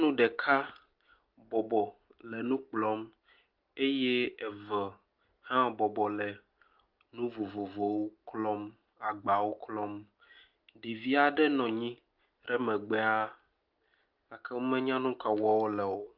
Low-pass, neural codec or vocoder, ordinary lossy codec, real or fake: 5.4 kHz; none; Opus, 32 kbps; real